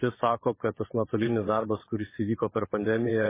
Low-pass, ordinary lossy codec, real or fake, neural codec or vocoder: 3.6 kHz; MP3, 16 kbps; fake; vocoder, 22.05 kHz, 80 mel bands, WaveNeXt